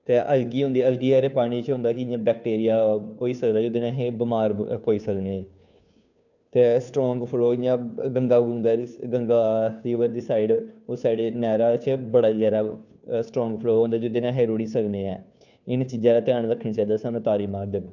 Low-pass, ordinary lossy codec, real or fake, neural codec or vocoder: 7.2 kHz; none; fake; codec, 16 kHz, 2 kbps, FunCodec, trained on Chinese and English, 25 frames a second